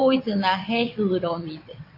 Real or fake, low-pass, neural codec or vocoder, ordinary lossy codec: fake; 5.4 kHz; vocoder, 22.05 kHz, 80 mel bands, WaveNeXt; AAC, 32 kbps